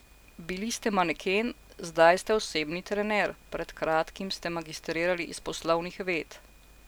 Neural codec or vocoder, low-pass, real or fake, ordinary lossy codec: none; none; real; none